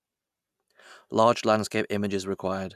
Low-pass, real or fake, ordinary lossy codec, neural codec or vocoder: 14.4 kHz; real; none; none